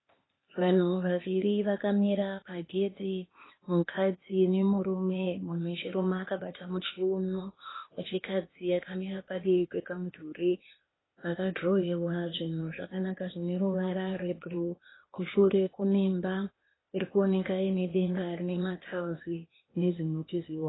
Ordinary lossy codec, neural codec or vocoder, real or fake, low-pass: AAC, 16 kbps; codec, 16 kHz, 0.8 kbps, ZipCodec; fake; 7.2 kHz